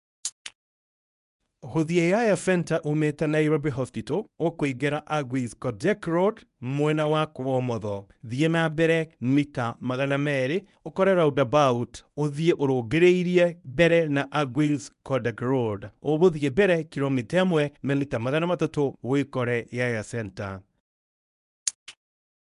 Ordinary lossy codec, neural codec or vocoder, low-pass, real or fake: none; codec, 24 kHz, 0.9 kbps, WavTokenizer, medium speech release version 1; 10.8 kHz; fake